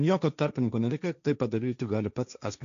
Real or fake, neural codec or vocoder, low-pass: fake; codec, 16 kHz, 1.1 kbps, Voila-Tokenizer; 7.2 kHz